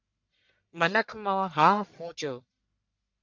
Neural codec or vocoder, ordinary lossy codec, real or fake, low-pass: codec, 44.1 kHz, 1.7 kbps, Pupu-Codec; MP3, 64 kbps; fake; 7.2 kHz